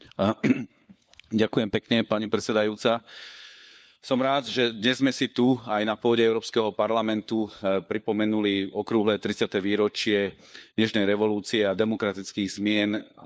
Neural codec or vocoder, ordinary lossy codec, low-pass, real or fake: codec, 16 kHz, 4 kbps, FunCodec, trained on LibriTTS, 50 frames a second; none; none; fake